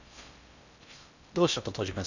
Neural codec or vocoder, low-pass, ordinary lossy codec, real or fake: codec, 16 kHz in and 24 kHz out, 0.6 kbps, FocalCodec, streaming, 4096 codes; 7.2 kHz; none; fake